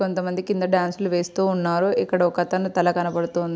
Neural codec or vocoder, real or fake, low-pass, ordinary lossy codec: none; real; none; none